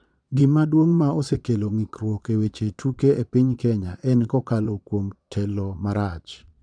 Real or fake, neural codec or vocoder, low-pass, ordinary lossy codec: fake; vocoder, 22.05 kHz, 80 mel bands, Vocos; 9.9 kHz; none